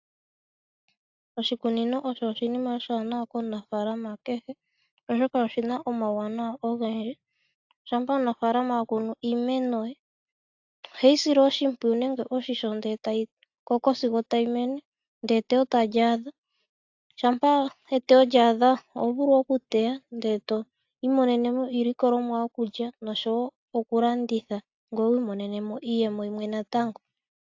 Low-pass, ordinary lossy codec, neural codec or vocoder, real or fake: 7.2 kHz; AAC, 48 kbps; none; real